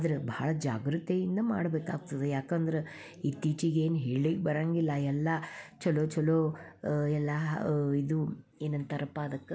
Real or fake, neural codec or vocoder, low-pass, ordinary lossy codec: real; none; none; none